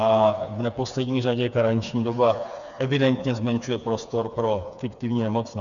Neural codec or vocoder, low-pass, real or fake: codec, 16 kHz, 4 kbps, FreqCodec, smaller model; 7.2 kHz; fake